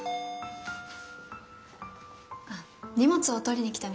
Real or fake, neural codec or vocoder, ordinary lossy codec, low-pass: real; none; none; none